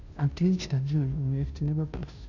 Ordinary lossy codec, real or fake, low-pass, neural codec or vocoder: none; fake; 7.2 kHz; codec, 16 kHz, 0.5 kbps, FunCodec, trained on Chinese and English, 25 frames a second